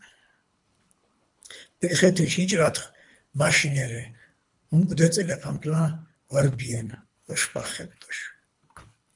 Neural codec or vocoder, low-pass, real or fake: codec, 24 kHz, 3 kbps, HILCodec; 10.8 kHz; fake